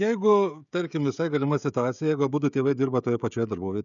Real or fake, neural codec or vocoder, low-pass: fake; codec, 16 kHz, 16 kbps, FreqCodec, smaller model; 7.2 kHz